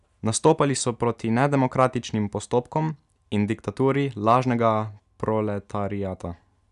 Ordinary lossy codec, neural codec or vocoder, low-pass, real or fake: none; none; 10.8 kHz; real